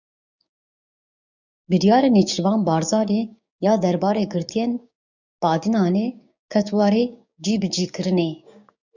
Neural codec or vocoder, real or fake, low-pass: codec, 16 kHz, 6 kbps, DAC; fake; 7.2 kHz